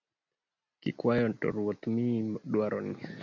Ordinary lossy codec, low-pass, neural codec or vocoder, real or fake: MP3, 64 kbps; 7.2 kHz; none; real